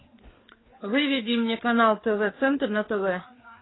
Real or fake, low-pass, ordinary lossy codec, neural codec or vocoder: fake; 7.2 kHz; AAC, 16 kbps; codec, 16 kHz, 4 kbps, FreqCodec, larger model